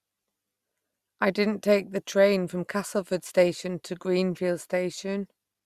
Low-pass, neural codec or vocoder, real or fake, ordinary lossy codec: 14.4 kHz; none; real; Opus, 64 kbps